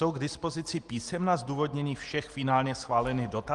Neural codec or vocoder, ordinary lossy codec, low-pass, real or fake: none; Opus, 24 kbps; 10.8 kHz; real